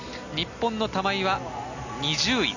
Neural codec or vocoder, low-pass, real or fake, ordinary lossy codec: none; 7.2 kHz; real; none